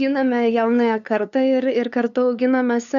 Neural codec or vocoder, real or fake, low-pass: codec, 16 kHz, 2 kbps, FunCodec, trained on LibriTTS, 25 frames a second; fake; 7.2 kHz